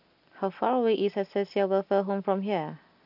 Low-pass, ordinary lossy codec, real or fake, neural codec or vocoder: 5.4 kHz; none; real; none